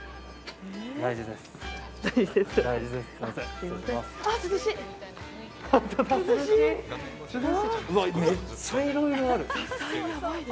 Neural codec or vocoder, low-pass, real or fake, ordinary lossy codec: none; none; real; none